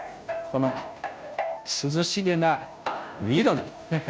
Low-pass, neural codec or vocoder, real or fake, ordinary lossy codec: none; codec, 16 kHz, 0.5 kbps, FunCodec, trained on Chinese and English, 25 frames a second; fake; none